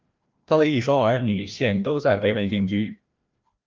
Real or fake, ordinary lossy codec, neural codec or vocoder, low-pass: fake; Opus, 24 kbps; codec, 16 kHz, 1 kbps, FreqCodec, larger model; 7.2 kHz